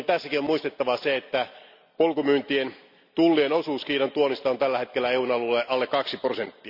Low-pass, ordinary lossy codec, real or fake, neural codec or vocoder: 5.4 kHz; none; real; none